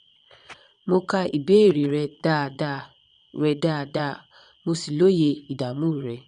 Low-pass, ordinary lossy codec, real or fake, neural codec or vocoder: 9.9 kHz; none; fake; vocoder, 22.05 kHz, 80 mel bands, Vocos